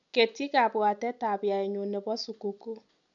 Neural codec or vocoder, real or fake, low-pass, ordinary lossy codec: none; real; 7.2 kHz; none